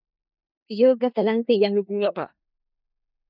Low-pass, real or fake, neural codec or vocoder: 5.4 kHz; fake; codec, 16 kHz in and 24 kHz out, 0.4 kbps, LongCat-Audio-Codec, four codebook decoder